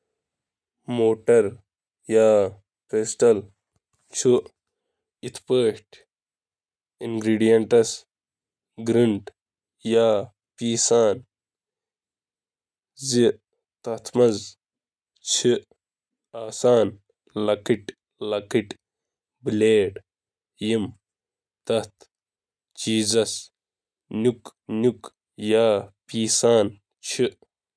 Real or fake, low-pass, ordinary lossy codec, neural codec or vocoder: real; none; none; none